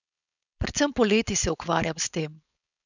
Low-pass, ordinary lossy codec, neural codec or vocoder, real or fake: 7.2 kHz; none; codec, 16 kHz, 4.8 kbps, FACodec; fake